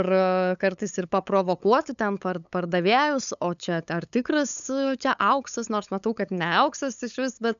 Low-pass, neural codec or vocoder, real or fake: 7.2 kHz; codec, 16 kHz, 8 kbps, FunCodec, trained on LibriTTS, 25 frames a second; fake